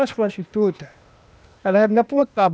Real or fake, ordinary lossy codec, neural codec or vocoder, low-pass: fake; none; codec, 16 kHz, 0.8 kbps, ZipCodec; none